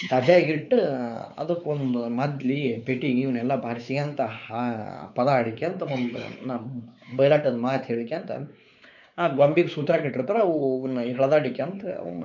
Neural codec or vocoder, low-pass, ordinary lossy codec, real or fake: codec, 16 kHz, 4 kbps, X-Codec, WavLM features, trained on Multilingual LibriSpeech; 7.2 kHz; none; fake